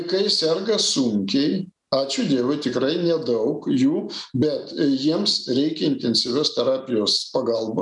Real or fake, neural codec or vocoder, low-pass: real; none; 10.8 kHz